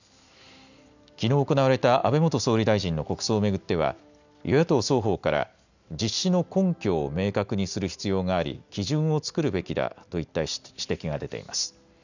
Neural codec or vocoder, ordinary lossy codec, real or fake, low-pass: none; none; real; 7.2 kHz